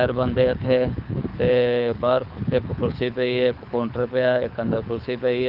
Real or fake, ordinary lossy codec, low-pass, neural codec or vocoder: fake; Opus, 24 kbps; 5.4 kHz; codec, 24 kHz, 6 kbps, HILCodec